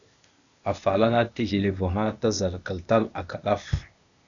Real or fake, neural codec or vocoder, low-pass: fake; codec, 16 kHz, 0.8 kbps, ZipCodec; 7.2 kHz